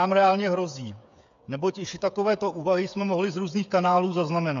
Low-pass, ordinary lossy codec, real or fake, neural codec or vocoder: 7.2 kHz; AAC, 64 kbps; fake; codec, 16 kHz, 8 kbps, FreqCodec, smaller model